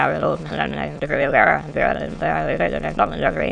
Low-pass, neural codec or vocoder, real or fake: 9.9 kHz; autoencoder, 22.05 kHz, a latent of 192 numbers a frame, VITS, trained on many speakers; fake